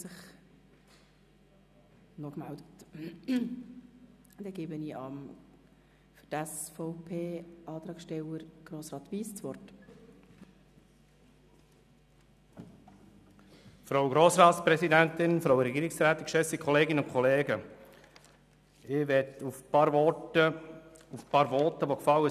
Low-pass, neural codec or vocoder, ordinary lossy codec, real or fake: 14.4 kHz; none; none; real